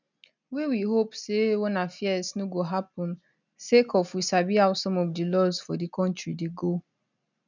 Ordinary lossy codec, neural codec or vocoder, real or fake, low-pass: none; none; real; 7.2 kHz